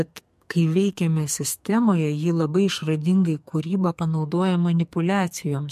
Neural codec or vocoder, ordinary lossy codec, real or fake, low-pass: codec, 44.1 kHz, 2.6 kbps, SNAC; MP3, 64 kbps; fake; 14.4 kHz